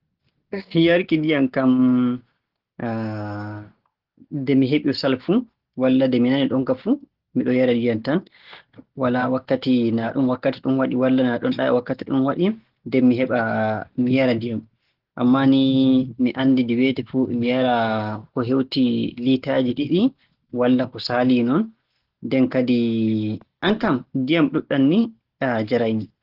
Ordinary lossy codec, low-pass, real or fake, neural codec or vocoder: Opus, 16 kbps; 5.4 kHz; real; none